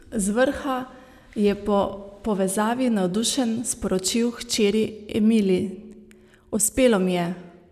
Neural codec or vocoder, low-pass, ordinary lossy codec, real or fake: none; 14.4 kHz; none; real